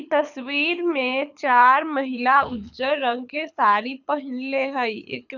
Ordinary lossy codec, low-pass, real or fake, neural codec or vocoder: none; 7.2 kHz; fake; codec, 24 kHz, 6 kbps, HILCodec